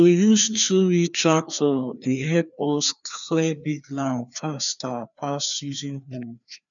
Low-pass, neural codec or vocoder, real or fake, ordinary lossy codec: 7.2 kHz; codec, 16 kHz, 2 kbps, FreqCodec, larger model; fake; none